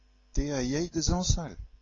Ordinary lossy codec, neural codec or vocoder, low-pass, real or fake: AAC, 32 kbps; none; 7.2 kHz; real